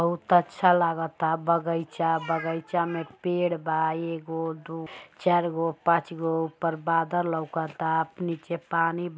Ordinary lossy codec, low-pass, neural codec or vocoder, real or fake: none; none; none; real